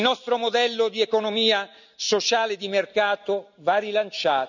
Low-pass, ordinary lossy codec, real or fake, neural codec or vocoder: 7.2 kHz; none; real; none